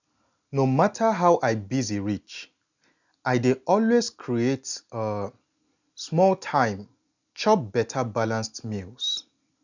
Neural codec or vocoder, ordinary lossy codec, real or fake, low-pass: none; none; real; 7.2 kHz